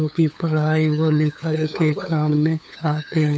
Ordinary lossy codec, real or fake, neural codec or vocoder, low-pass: none; fake; codec, 16 kHz, 8 kbps, FunCodec, trained on LibriTTS, 25 frames a second; none